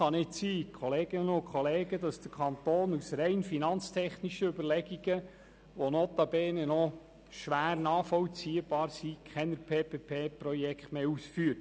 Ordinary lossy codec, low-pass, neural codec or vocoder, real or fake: none; none; none; real